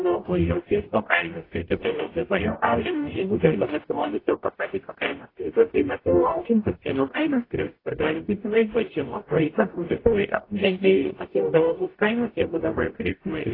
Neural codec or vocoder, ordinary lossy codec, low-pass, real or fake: codec, 44.1 kHz, 0.9 kbps, DAC; AAC, 24 kbps; 5.4 kHz; fake